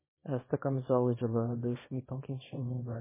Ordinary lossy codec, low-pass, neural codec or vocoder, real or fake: MP3, 16 kbps; 3.6 kHz; codec, 24 kHz, 0.9 kbps, WavTokenizer, small release; fake